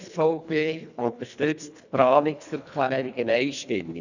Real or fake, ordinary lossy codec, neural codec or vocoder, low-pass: fake; none; codec, 24 kHz, 1.5 kbps, HILCodec; 7.2 kHz